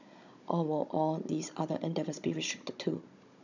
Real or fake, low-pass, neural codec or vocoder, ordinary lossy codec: fake; 7.2 kHz; codec, 16 kHz, 16 kbps, FunCodec, trained on Chinese and English, 50 frames a second; none